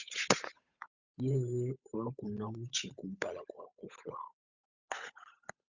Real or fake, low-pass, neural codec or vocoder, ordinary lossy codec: fake; 7.2 kHz; codec, 16 kHz, 8 kbps, FunCodec, trained on Chinese and English, 25 frames a second; Opus, 64 kbps